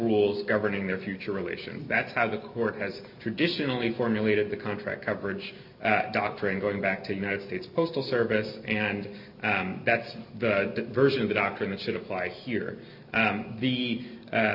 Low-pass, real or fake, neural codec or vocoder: 5.4 kHz; real; none